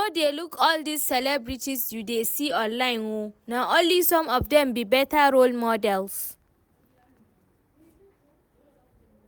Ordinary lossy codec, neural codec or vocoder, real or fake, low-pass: none; none; real; none